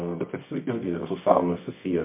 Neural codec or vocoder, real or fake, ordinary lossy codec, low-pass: codec, 24 kHz, 0.9 kbps, WavTokenizer, medium music audio release; fake; MP3, 24 kbps; 3.6 kHz